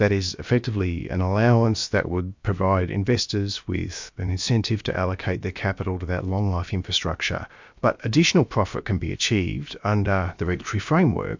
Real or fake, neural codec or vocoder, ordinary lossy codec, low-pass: fake; codec, 16 kHz, about 1 kbps, DyCAST, with the encoder's durations; MP3, 64 kbps; 7.2 kHz